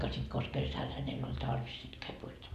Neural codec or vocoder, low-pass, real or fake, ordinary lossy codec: none; 14.4 kHz; real; Opus, 64 kbps